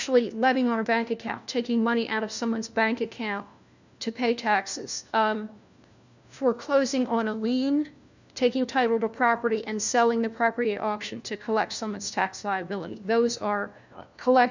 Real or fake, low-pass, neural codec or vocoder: fake; 7.2 kHz; codec, 16 kHz, 1 kbps, FunCodec, trained on LibriTTS, 50 frames a second